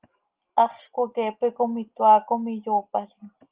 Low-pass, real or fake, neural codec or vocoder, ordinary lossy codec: 3.6 kHz; real; none; Opus, 32 kbps